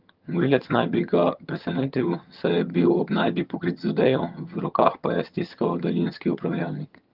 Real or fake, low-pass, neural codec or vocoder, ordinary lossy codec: fake; 5.4 kHz; vocoder, 22.05 kHz, 80 mel bands, HiFi-GAN; Opus, 24 kbps